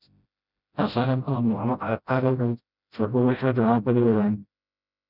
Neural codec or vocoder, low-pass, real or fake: codec, 16 kHz, 0.5 kbps, FreqCodec, smaller model; 5.4 kHz; fake